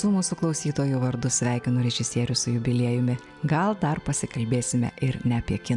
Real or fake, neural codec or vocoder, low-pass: real; none; 10.8 kHz